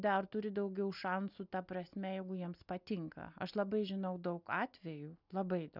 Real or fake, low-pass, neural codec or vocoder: real; 5.4 kHz; none